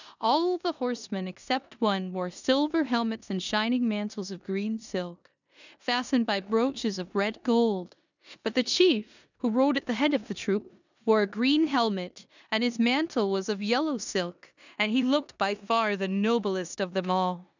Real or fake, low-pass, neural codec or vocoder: fake; 7.2 kHz; codec, 16 kHz in and 24 kHz out, 0.9 kbps, LongCat-Audio-Codec, four codebook decoder